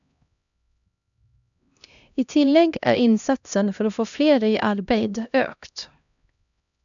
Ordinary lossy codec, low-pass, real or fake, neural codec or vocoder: none; 7.2 kHz; fake; codec, 16 kHz, 1 kbps, X-Codec, HuBERT features, trained on LibriSpeech